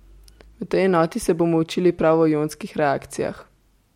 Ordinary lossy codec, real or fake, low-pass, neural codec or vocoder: MP3, 64 kbps; real; 19.8 kHz; none